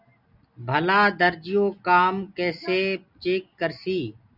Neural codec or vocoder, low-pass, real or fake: none; 5.4 kHz; real